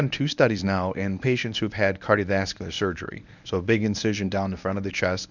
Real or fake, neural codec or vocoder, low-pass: fake; codec, 24 kHz, 0.9 kbps, WavTokenizer, medium speech release version 1; 7.2 kHz